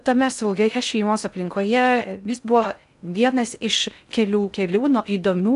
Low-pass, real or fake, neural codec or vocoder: 10.8 kHz; fake; codec, 16 kHz in and 24 kHz out, 0.6 kbps, FocalCodec, streaming, 2048 codes